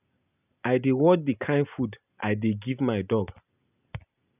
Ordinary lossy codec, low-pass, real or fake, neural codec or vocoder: none; 3.6 kHz; real; none